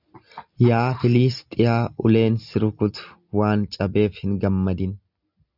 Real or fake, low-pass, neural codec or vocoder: real; 5.4 kHz; none